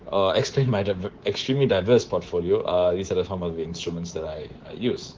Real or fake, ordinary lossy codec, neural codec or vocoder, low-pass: fake; Opus, 16 kbps; codec, 24 kHz, 3.1 kbps, DualCodec; 7.2 kHz